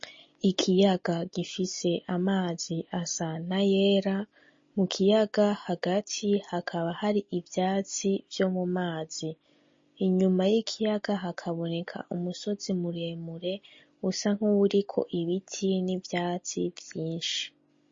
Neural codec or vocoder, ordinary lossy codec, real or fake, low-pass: none; MP3, 32 kbps; real; 7.2 kHz